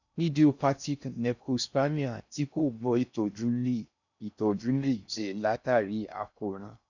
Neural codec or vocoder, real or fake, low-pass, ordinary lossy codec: codec, 16 kHz in and 24 kHz out, 0.6 kbps, FocalCodec, streaming, 2048 codes; fake; 7.2 kHz; none